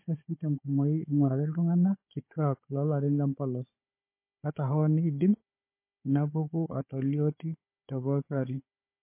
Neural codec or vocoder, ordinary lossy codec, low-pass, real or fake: codec, 16 kHz, 4 kbps, FunCodec, trained on Chinese and English, 50 frames a second; MP3, 24 kbps; 3.6 kHz; fake